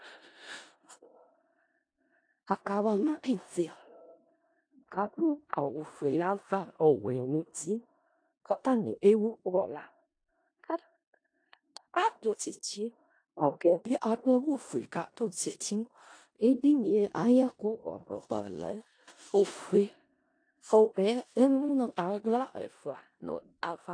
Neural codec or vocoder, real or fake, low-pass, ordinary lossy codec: codec, 16 kHz in and 24 kHz out, 0.4 kbps, LongCat-Audio-Codec, four codebook decoder; fake; 9.9 kHz; AAC, 48 kbps